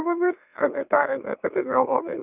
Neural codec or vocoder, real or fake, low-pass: autoencoder, 44.1 kHz, a latent of 192 numbers a frame, MeloTTS; fake; 3.6 kHz